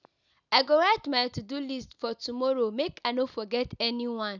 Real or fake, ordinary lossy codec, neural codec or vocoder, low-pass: real; none; none; 7.2 kHz